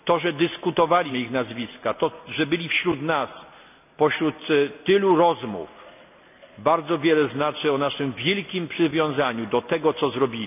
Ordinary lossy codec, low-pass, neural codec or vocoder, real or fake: none; 3.6 kHz; none; real